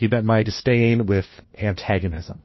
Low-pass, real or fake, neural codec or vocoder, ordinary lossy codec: 7.2 kHz; fake; codec, 16 kHz, 1 kbps, FunCodec, trained on LibriTTS, 50 frames a second; MP3, 24 kbps